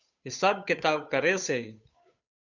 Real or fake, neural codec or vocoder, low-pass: fake; codec, 16 kHz, 8 kbps, FunCodec, trained on Chinese and English, 25 frames a second; 7.2 kHz